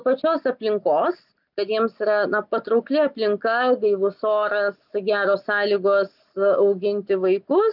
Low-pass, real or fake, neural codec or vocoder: 5.4 kHz; real; none